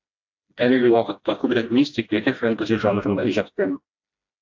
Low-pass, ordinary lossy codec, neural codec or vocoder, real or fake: 7.2 kHz; AAC, 48 kbps; codec, 16 kHz, 1 kbps, FreqCodec, smaller model; fake